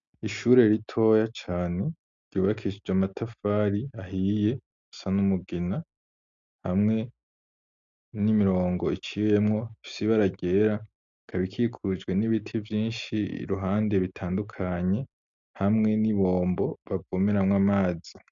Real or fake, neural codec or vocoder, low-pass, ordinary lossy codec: real; none; 7.2 kHz; MP3, 64 kbps